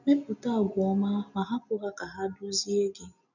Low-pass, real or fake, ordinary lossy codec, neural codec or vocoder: 7.2 kHz; real; none; none